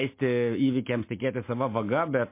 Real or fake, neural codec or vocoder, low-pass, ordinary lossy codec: real; none; 3.6 kHz; MP3, 24 kbps